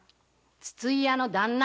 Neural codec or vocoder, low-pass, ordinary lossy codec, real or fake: none; none; none; real